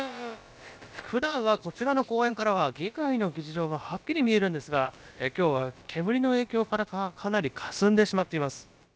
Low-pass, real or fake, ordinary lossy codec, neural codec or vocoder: none; fake; none; codec, 16 kHz, about 1 kbps, DyCAST, with the encoder's durations